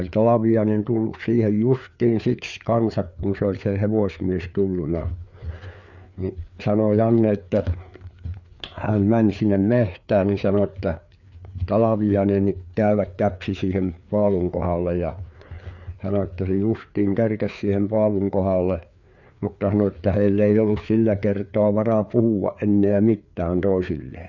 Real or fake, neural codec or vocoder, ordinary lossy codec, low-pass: fake; codec, 16 kHz, 4 kbps, FreqCodec, larger model; none; 7.2 kHz